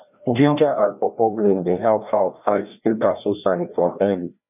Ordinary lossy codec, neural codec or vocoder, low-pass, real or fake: none; codec, 16 kHz, 1 kbps, FreqCodec, larger model; 3.6 kHz; fake